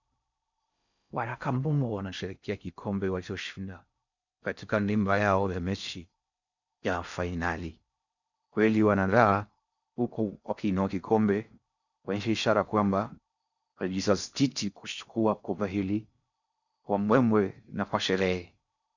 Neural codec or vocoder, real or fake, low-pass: codec, 16 kHz in and 24 kHz out, 0.6 kbps, FocalCodec, streaming, 4096 codes; fake; 7.2 kHz